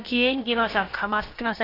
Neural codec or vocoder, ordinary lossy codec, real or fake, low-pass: codec, 16 kHz, about 1 kbps, DyCAST, with the encoder's durations; AAC, 24 kbps; fake; 5.4 kHz